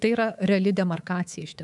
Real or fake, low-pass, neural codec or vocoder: fake; 10.8 kHz; codec, 24 kHz, 3.1 kbps, DualCodec